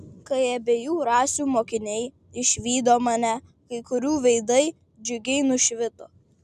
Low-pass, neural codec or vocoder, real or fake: 14.4 kHz; none; real